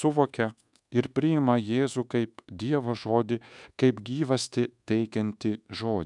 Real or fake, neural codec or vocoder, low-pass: fake; codec, 24 kHz, 1.2 kbps, DualCodec; 10.8 kHz